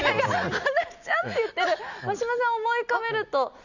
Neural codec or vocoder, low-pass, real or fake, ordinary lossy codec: none; 7.2 kHz; real; none